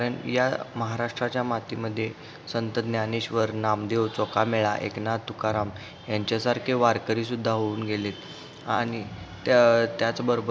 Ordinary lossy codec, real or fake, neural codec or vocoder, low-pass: none; real; none; none